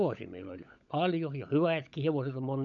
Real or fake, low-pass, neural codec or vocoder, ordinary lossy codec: fake; 7.2 kHz; codec, 16 kHz, 16 kbps, FunCodec, trained on LibriTTS, 50 frames a second; none